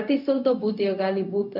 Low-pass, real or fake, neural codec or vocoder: 5.4 kHz; fake; codec, 16 kHz, 0.4 kbps, LongCat-Audio-Codec